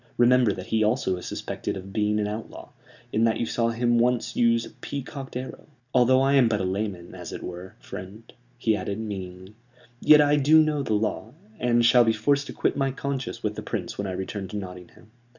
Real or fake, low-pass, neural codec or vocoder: real; 7.2 kHz; none